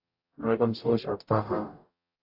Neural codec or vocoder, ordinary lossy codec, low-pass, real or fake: codec, 44.1 kHz, 0.9 kbps, DAC; AAC, 48 kbps; 5.4 kHz; fake